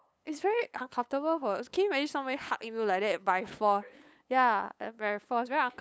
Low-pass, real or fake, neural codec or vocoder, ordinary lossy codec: none; fake; codec, 16 kHz, 8 kbps, FunCodec, trained on LibriTTS, 25 frames a second; none